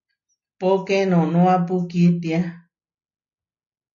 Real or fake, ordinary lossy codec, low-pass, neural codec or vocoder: real; MP3, 64 kbps; 7.2 kHz; none